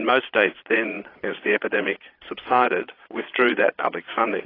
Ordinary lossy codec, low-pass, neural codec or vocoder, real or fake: AAC, 24 kbps; 5.4 kHz; vocoder, 22.05 kHz, 80 mel bands, Vocos; fake